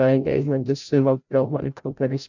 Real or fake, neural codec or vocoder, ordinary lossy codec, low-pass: fake; codec, 16 kHz, 0.5 kbps, FreqCodec, larger model; none; 7.2 kHz